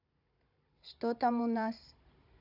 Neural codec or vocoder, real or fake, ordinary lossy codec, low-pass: codec, 16 kHz, 16 kbps, FunCodec, trained on Chinese and English, 50 frames a second; fake; AAC, 48 kbps; 5.4 kHz